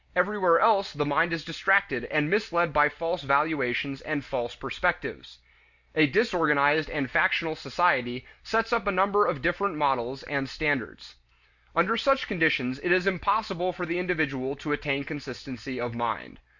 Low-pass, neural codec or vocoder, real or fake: 7.2 kHz; none; real